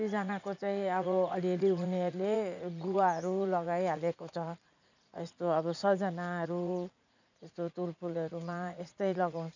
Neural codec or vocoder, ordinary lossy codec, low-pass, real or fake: vocoder, 44.1 kHz, 80 mel bands, Vocos; none; 7.2 kHz; fake